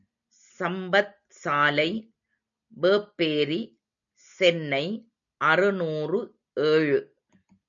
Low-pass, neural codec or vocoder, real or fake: 7.2 kHz; none; real